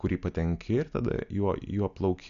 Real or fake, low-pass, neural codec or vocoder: real; 7.2 kHz; none